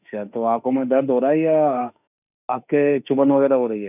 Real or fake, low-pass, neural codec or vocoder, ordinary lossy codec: fake; 3.6 kHz; autoencoder, 48 kHz, 32 numbers a frame, DAC-VAE, trained on Japanese speech; none